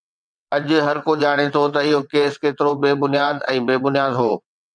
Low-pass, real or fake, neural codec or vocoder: 9.9 kHz; fake; vocoder, 22.05 kHz, 80 mel bands, WaveNeXt